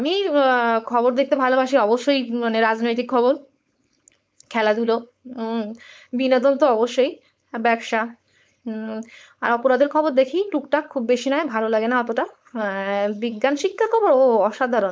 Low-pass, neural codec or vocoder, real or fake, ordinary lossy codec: none; codec, 16 kHz, 4.8 kbps, FACodec; fake; none